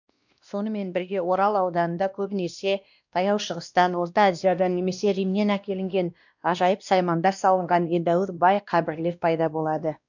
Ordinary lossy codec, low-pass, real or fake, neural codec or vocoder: none; 7.2 kHz; fake; codec, 16 kHz, 1 kbps, X-Codec, WavLM features, trained on Multilingual LibriSpeech